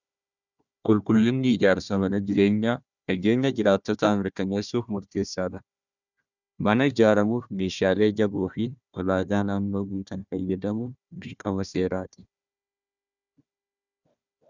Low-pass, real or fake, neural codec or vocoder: 7.2 kHz; fake; codec, 16 kHz, 1 kbps, FunCodec, trained on Chinese and English, 50 frames a second